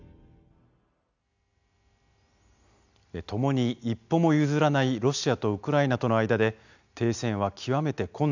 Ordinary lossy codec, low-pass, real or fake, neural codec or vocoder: none; 7.2 kHz; real; none